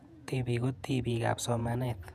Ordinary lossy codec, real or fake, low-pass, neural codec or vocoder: none; fake; 14.4 kHz; vocoder, 48 kHz, 128 mel bands, Vocos